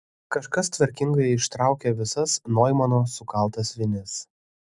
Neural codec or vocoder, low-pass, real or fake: none; 10.8 kHz; real